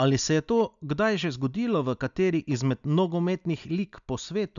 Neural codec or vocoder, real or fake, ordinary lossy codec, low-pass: none; real; none; 7.2 kHz